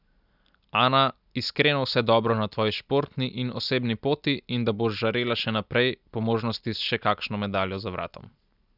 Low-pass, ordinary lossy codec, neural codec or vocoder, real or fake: 5.4 kHz; none; none; real